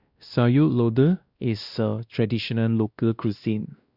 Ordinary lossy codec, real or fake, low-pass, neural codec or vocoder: Opus, 64 kbps; fake; 5.4 kHz; codec, 16 kHz, 1 kbps, X-Codec, WavLM features, trained on Multilingual LibriSpeech